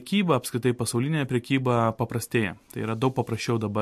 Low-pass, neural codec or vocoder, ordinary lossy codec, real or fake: 14.4 kHz; none; MP3, 64 kbps; real